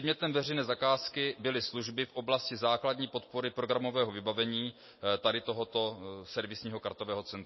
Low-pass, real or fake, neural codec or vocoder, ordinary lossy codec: 7.2 kHz; real; none; MP3, 24 kbps